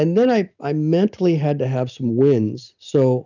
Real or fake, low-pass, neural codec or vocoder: real; 7.2 kHz; none